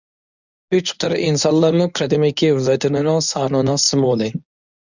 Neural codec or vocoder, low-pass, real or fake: codec, 24 kHz, 0.9 kbps, WavTokenizer, medium speech release version 1; 7.2 kHz; fake